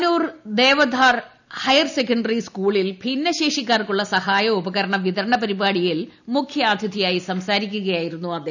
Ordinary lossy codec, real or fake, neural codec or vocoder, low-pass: none; real; none; 7.2 kHz